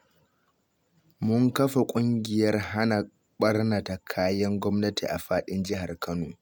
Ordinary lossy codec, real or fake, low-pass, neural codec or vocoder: none; real; none; none